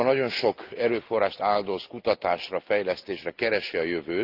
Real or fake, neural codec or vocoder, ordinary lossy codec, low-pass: real; none; Opus, 32 kbps; 5.4 kHz